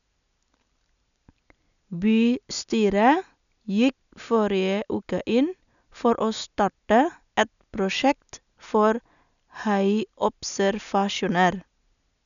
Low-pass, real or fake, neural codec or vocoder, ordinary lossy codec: 7.2 kHz; real; none; none